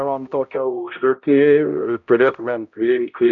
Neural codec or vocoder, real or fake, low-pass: codec, 16 kHz, 0.5 kbps, X-Codec, HuBERT features, trained on balanced general audio; fake; 7.2 kHz